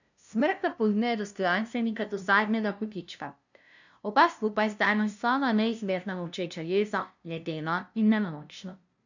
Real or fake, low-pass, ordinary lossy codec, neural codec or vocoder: fake; 7.2 kHz; none; codec, 16 kHz, 0.5 kbps, FunCodec, trained on LibriTTS, 25 frames a second